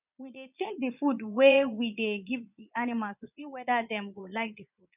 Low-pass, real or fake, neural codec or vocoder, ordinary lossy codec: 3.6 kHz; fake; vocoder, 22.05 kHz, 80 mel bands, Vocos; none